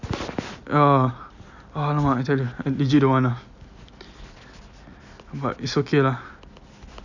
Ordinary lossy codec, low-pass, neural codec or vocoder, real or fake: none; 7.2 kHz; none; real